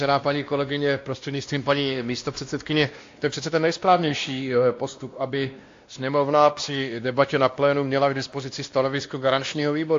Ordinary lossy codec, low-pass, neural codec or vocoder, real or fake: AAC, 48 kbps; 7.2 kHz; codec, 16 kHz, 1 kbps, X-Codec, WavLM features, trained on Multilingual LibriSpeech; fake